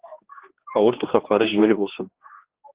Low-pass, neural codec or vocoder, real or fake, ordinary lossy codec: 3.6 kHz; codec, 16 kHz, 2 kbps, X-Codec, HuBERT features, trained on general audio; fake; Opus, 16 kbps